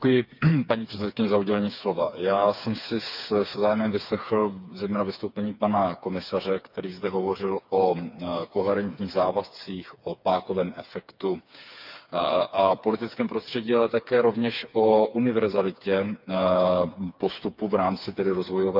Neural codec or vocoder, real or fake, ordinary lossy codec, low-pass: codec, 16 kHz, 4 kbps, FreqCodec, smaller model; fake; none; 5.4 kHz